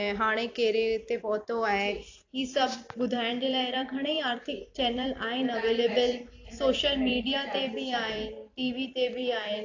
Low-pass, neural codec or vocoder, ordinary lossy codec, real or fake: 7.2 kHz; vocoder, 44.1 kHz, 128 mel bands every 256 samples, BigVGAN v2; AAC, 48 kbps; fake